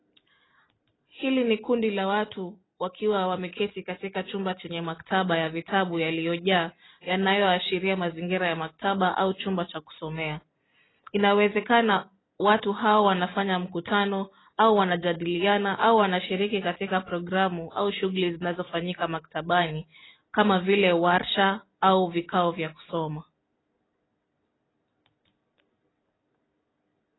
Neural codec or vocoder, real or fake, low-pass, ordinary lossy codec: none; real; 7.2 kHz; AAC, 16 kbps